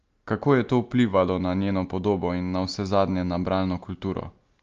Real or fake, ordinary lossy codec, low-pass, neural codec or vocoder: real; Opus, 32 kbps; 7.2 kHz; none